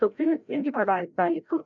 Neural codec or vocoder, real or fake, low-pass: codec, 16 kHz, 0.5 kbps, FreqCodec, larger model; fake; 7.2 kHz